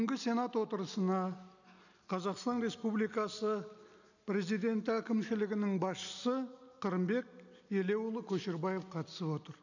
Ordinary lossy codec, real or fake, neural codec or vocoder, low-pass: none; real; none; 7.2 kHz